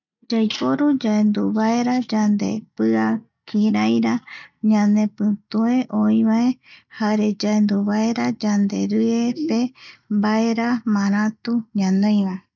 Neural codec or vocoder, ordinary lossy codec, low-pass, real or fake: none; none; 7.2 kHz; real